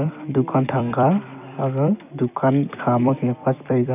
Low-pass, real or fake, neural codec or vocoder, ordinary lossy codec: 3.6 kHz; fake; vocoder, 44.1 kHz, 128 mel bands every 256 samples, BigVGAN v2; none